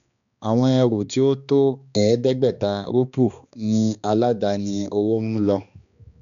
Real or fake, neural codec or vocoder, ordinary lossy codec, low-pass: fake; codec, 16 kHz, 2 kbps, X-Codec, HuBERT features, trained on balanced general audio; none; 7.2 kHz